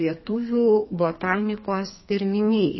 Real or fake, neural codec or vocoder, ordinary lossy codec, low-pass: fake; codec, 32 kHz, 1.9 kbps, SNAC; MP3, 24 kbps; 7.2 kHz